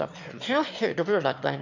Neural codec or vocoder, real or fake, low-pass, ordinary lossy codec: autoencoder, 22.05 kHz, a latent of 192 numbers a frame, VITS, trained on one speaker; fake; 7.2 kHz; none